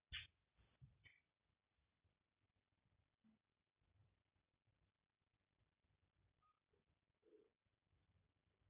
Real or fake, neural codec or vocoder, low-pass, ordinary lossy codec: real; none; 3.6 kHz; Opus, 64 kbps